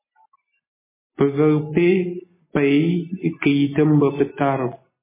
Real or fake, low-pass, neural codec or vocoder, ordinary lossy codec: real; 3.6 kHz; none; MP3, 16 kbps